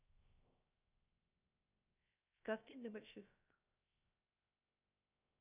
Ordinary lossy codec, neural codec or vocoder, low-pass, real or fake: none; codec, 16 kHz, 0.3 kbps, FocalCodec; 3.6 kHz; fake